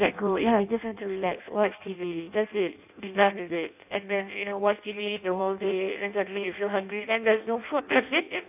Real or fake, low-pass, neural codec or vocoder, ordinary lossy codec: fake; 3.6 kHz; codec, 16 kHz in and 24 kHz out, 0.6 kbps, FireRedTTS-2 codec; none